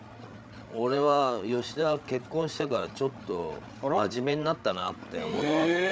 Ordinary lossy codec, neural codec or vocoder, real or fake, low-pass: none; codec, 16 kHz, 8 kbps, FreqCodec, larger model; fake; none